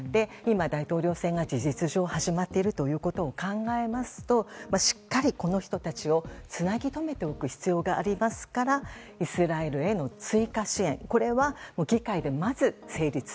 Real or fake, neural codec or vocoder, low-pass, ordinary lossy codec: real; none; none; none